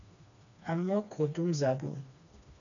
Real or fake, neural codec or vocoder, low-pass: fake; codec, 16 kHz, 2 kbps, FreqCodec, smaller model; 7.2 kHz